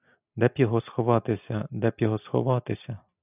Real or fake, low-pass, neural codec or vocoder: real; 3.6 kHz; none